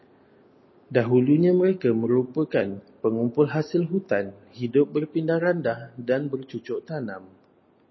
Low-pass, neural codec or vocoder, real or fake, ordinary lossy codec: 7.2 kHz; none; real; MP3, 24 kbps